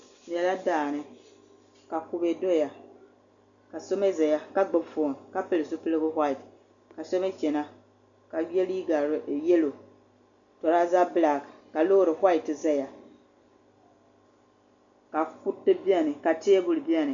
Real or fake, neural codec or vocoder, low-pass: real; none; 7.2 kHz